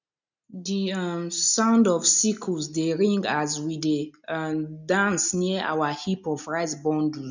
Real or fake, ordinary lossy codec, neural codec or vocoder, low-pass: real; none; none; 7.2 kHz